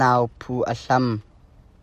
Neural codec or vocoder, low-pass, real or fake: none; 14.4 kHz; real